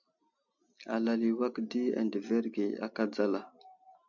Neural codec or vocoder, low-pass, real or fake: none; 7.2 kHz; real